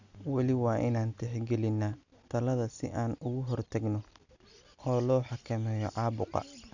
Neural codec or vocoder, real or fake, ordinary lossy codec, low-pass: none; real; none; 7.2 kHz